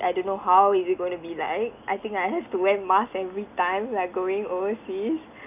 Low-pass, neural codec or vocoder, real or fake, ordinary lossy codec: 3.6 kHz; none; real; none